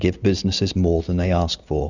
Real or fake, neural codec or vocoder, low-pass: real; none; 7.2 kHz